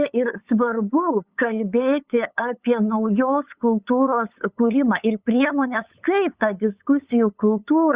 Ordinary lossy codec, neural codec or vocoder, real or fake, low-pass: Opus, 64 kbps; codec, 16 kHz, 8 kbps, FunCodec, trained on LibriTTS, 25 frames a second; fake; 3.6 kHz